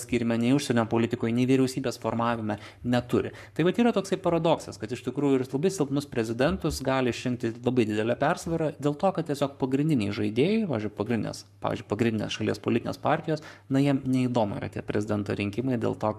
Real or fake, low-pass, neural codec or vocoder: fake; 14.4 kHz; codec, 44.1 kHz, 7.8 kbps, Pupu-Codec